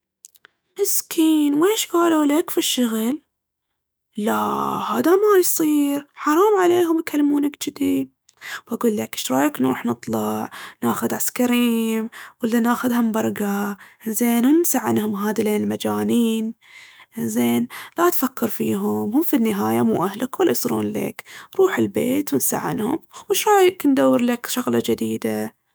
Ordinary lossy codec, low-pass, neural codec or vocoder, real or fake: none; none; autoencoder, 48 kHz, 128 numbers a frame, DAC-VAE, trained on Japanese speech; fake